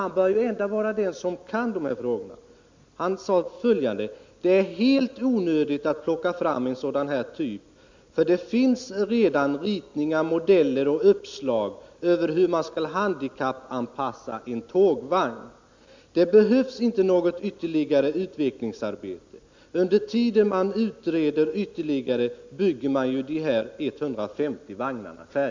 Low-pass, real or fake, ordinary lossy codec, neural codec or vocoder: 7.2 kHz; real; MP3, 64 kbps; none